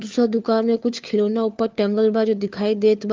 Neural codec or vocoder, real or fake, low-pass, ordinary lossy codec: codec, 16 kHz, 4 kbps, FunCodec, trained on LibriTTS, 50 frames a second; fake; 7.2 kHz; Opus, 32 kbps